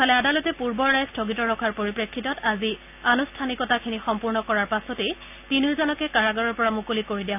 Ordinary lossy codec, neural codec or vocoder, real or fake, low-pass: none; none; real; 3.6 kHz